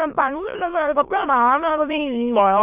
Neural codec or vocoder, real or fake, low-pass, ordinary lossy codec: autoencoder, 22.05 kHz, a latent of 192 numbers a frame, VITS, trained on many speakers; fake; 3.6 kHz; none